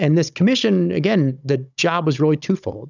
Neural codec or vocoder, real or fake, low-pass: codec, 16 kHz, 16 kbps, FunCodec, trained on Chinese and English, 50 frames a second; fake; 7.2 kHz